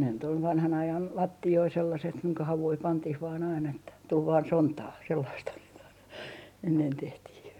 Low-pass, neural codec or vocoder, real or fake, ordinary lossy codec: 19.8 kHz; none; real; none